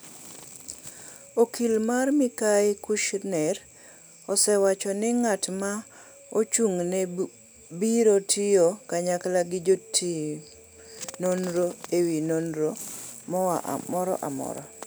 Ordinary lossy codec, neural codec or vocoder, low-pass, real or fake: none; none; none; real